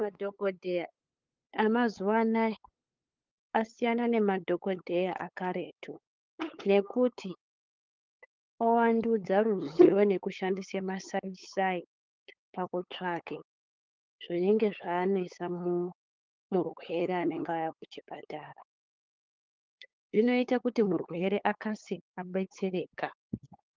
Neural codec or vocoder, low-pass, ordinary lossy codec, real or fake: codec, 16 kHz, 8 kbps, FunCodec, trained on LibriTTS, 25 frames a second; 7.2 kHz; Opus, 24 kbps; fake